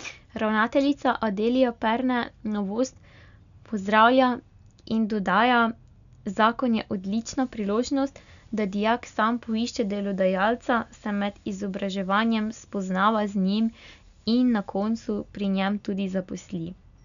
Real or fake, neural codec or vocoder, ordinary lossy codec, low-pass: real; none; none; 7.2 kHz